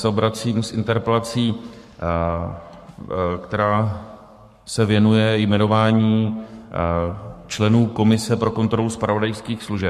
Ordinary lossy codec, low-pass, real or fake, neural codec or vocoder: MP3, 64 kbps; 14.4 kHz; fake; codec, 44.1 kHz, 7.8 kbps, Pupu-Codec